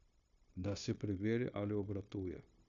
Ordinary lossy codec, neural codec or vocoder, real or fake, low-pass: none; codec, 16 kHz, 0.9 kbps, LongCat-Audio-Codec; fake; 7.2 kHz